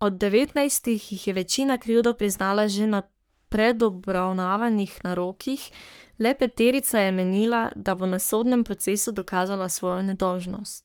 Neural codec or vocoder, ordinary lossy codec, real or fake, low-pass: codec, 44.1 kHz, 3.4 kbps, Pupu-Codec; none; fake; none